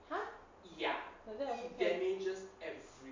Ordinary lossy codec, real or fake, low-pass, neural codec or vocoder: MP3, 48 kbps; real; 7.2 kHz; none